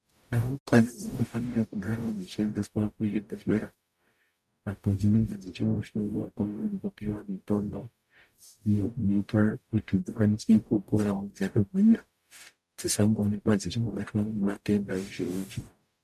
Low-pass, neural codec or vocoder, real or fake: 14.4 kHz; codec, 44.1 kHz, 0.9 kbps, DAC; fake